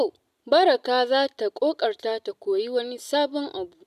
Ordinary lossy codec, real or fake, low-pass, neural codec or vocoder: none; real; 14.4 kHz; none